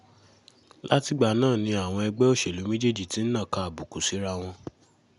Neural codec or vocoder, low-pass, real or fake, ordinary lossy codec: none; 10.8 kHz; real; none